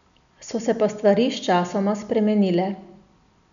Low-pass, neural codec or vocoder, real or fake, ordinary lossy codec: 7.2 kHz; none; real; none